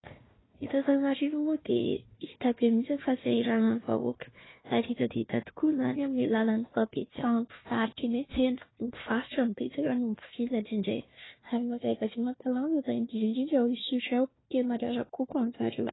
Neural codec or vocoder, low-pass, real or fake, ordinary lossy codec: codec, 16 kHz, 1 kbps, FunCodec, trained on Chinese and English, 50 frames a second; 7.2 kHz; fake; AAC, 16 kbps